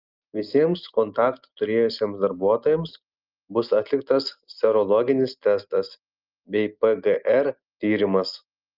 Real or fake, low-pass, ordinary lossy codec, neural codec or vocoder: real; 5.4 kHz; Opus, 32 kbps; none